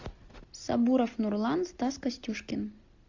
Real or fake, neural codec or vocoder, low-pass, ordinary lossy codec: real; none; 7.2 kHz; AAC, 48 kbps